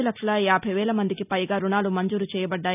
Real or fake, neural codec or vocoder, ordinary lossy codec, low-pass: real; none; none; 3.6 kHz